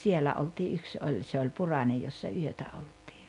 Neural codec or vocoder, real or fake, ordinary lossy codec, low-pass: none; real; MP3, 64 kbps; 10.8 kHz